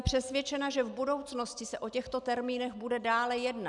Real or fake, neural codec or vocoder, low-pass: real; none; 10.8 kHz